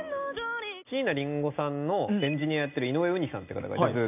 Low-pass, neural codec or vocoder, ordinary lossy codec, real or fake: 3.6 kHz; none; none; real